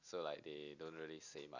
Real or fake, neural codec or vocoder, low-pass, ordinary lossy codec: real; none; 7.2 kHz; none